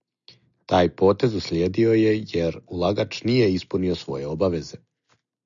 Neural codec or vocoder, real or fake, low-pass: none; real; 7.2 kHz